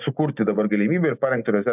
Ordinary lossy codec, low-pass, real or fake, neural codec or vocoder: AAC, 32 kbps; 3.6 kHz; real; none